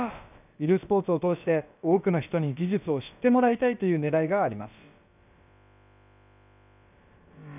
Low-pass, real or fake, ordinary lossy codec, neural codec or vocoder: 3.6 kHz; fake; none; codec, 16 kHz, about 1 kbps, DyCAST, with the encoder's durations